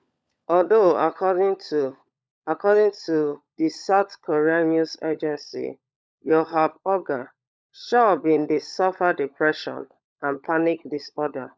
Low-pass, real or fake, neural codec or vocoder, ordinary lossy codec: none; fake; codec, 16 kHz, 16 kbps, FunCodec, trained on LibriTTS, 50 frames a second; none